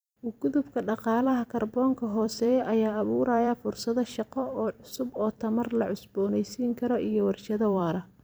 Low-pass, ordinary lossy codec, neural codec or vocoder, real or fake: none; none; vocoder, 44.1 kHz, 128 mel bands every 256 samples, BigVGAN v2; fake